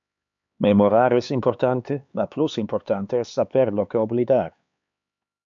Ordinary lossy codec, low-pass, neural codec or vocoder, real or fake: AAC, 64 kbps; 7.2 kHz; codec, 16 kHz, 4 kbps, X-Codec, HuBERT features, trained on LibriSpeech; fake